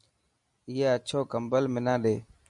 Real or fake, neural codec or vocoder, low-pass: real; none; 10.8 kHz